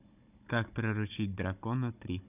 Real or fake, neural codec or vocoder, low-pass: fake; codec, 16 kHz, 16 kbps, FunCodec, trained on Chinese and English, 50 frames a second; 3.6 kHz